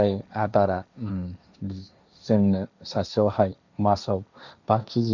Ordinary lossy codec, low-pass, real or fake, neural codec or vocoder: none; 7.2 kHz; fake; codec, 16 kHz, 1.1 kbps, Voila-Tokenizer